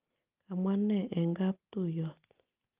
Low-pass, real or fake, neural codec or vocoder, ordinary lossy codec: 3.6 kHz; real; none; Opus, 32 kbps